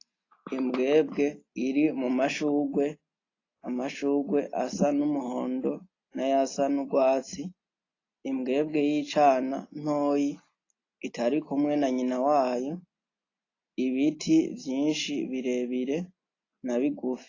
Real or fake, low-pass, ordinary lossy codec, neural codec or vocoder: real; 7.2 kHz; AAC, 32 kbps; none